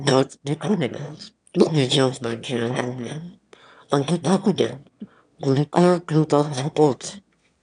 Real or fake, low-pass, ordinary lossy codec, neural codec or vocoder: fake; 9.9 kHz; none; autoencoder, 22.05 kHz, a latent of 192 numbers a frame, VITS, trained on one speaker